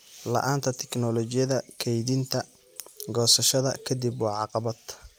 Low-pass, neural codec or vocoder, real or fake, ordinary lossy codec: none; vocoder, 44.1 kHz, 128 mel bands every 256 samples, BigVGAN v2; fake; none